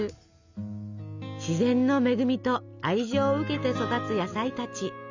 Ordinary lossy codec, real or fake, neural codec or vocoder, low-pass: none; real; none; 7.2 kHz